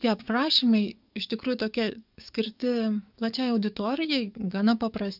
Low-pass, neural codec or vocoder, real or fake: 5.4 kHz; codec, 44.1 kHz, 7.8 kbps, DAC; fake